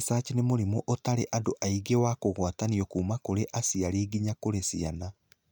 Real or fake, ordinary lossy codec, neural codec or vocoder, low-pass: real; none; none; none